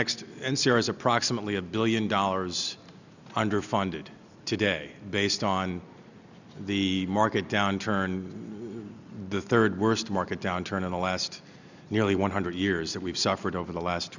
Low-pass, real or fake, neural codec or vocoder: 7.2 kHz; real; none